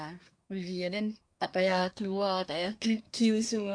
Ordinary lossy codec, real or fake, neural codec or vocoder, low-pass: none; fake; codec, 24 kHz, 1 kbps, SNAC; 9.9 kHz